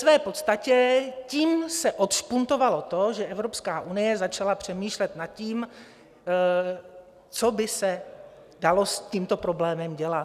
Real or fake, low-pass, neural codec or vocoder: real; 14.4 kHz; none